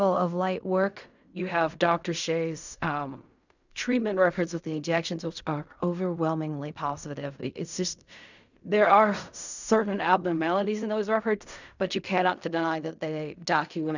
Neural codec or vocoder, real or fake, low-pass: codec, 16 kHz in and 24 kHz out, 0.4 kbps, LongCat-Audio-Codec, fine tuned four codebook decoder; fake; 7.2 kHz